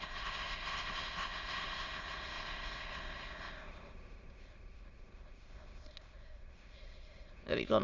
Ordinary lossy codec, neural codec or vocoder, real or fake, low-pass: Opus, 32 kbps; autoencoder, 22.05 kHz, a latent of 192 numbers a frame, VITS, trained on many speakers; fake; 7.2 kHz